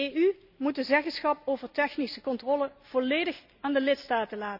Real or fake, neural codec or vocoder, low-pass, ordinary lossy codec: real; none; 5.4 kHz; none